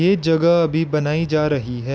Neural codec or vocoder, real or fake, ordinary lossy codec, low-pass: none; real; none; none